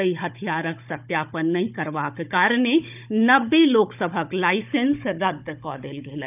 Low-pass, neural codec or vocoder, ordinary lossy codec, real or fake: 3.6 kHz; codec, 16 kHz, 16 kbps, FunCodec, trained on Chinese and English, 50 frames a second; none; fake